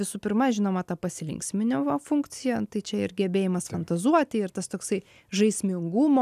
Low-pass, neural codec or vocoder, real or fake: 14.4 kHz; none; real